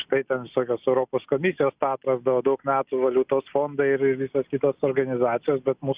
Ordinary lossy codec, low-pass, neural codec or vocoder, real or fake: Opus, 64 kbps; 3.6 kHz; none; real